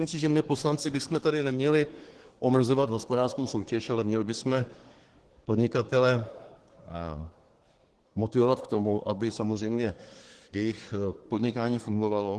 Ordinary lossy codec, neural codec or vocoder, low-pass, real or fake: Opus, 16 kbps; codec, 24 kHz, 1 kbps, SNAC; 10.8 kHz; fake